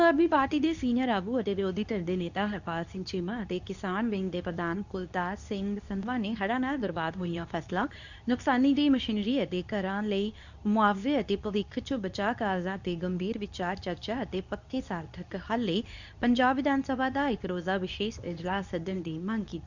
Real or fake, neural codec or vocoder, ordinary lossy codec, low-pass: fake; codec, 24 kHz, 0.9 kbps, WavTokenizer, medium speech release version 2; none; 7.2 kHz